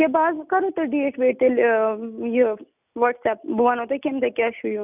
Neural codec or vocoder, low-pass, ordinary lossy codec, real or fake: none; 3.6 kHz; none; real